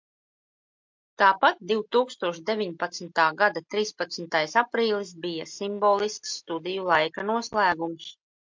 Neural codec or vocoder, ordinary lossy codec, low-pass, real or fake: none; MP3, 64 kbps; 7.2 kHz; real